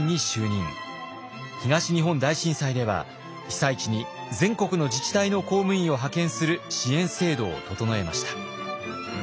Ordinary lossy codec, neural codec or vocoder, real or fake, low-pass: none; none; real; none